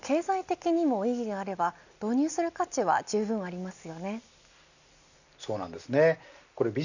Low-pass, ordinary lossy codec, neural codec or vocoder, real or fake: 7.2 kHz; none; none; real